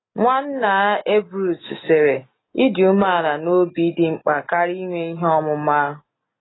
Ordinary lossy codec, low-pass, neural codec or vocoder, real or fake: AAC, 16 kbps; 7.2 kHz; none; real